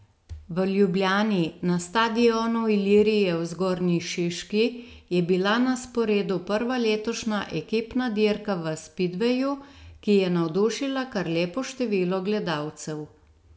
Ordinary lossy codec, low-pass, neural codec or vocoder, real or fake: none; none; none; real